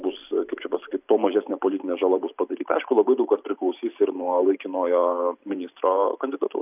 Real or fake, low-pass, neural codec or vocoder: fake; 3.6 kHz; vocoder, 44.1 kHz, 128 mel bands every 512 samples, BigVGAN v2